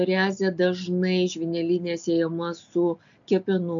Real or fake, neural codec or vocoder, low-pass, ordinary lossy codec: real; none; 7.2 kHz; AAC, 64 kbps